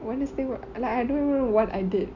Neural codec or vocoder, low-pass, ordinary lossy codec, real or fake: none; 7.2 kHz; none; real